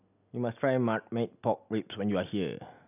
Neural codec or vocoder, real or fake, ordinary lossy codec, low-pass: none; real; none; 3.6 kHz